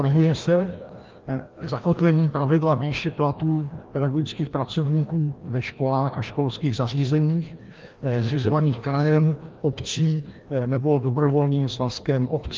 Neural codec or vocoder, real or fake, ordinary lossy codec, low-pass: codec, 16 kHz, 1 kbps, FreqCodec, larger model; fake; Opus, 32 kbps; 7.2 kHz